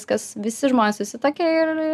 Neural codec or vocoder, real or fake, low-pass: none; real; 14.4 kHz